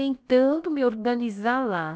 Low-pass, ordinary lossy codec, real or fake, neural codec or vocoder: none; none; fake; codec, 16 kHz, about 1 kbps, DyCAST, with the encoder's durations